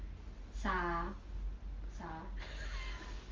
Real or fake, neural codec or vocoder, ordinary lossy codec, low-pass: real; none; Opus, 32 kbps; 7.2 kHz